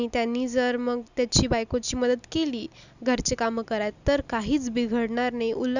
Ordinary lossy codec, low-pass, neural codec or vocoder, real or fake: none; 7.2 kHz; none; real